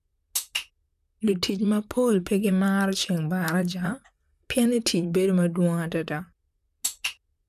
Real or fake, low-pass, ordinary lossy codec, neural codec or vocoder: fake; 14.4 kHz; none; vocoder, 44.1 kHz, 128 mel bands, Pupu-Vocoder